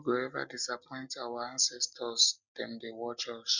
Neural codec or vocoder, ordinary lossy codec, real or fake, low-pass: none; none; real; 7.2 kHz